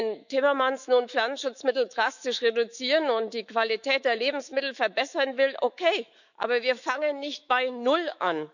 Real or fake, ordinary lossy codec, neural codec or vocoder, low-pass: fake; none; autoencoder, 48 kHz, 128 numbers a frame, DAC-VAE, trained on Japanese speech; 7.2 kHz